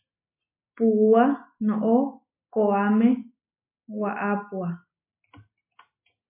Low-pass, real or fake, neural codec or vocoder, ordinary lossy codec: 3.6 kHz; real; none; MP3, 24 kbps